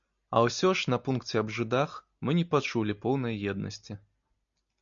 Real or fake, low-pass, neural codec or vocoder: real; 7.2 kHz; none